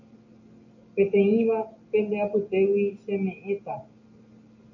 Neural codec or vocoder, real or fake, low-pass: none; real; 7.2 kHz